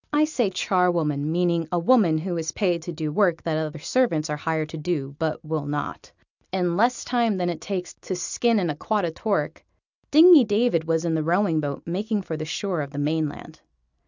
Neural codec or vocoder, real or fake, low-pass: none; real; 7.2 kHz